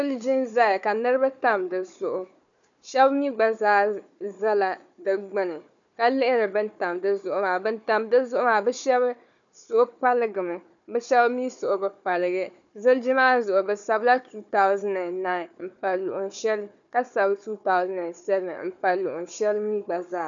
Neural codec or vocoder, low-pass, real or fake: codec, 16 kHz, 4 kbps, FunCodec, trained on Chinese and English, 50 frames a second; 7.2 kHz; fake